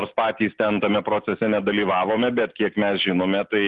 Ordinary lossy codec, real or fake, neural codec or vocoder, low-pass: Opus, 32 kbps; real; none; 10.8 kHz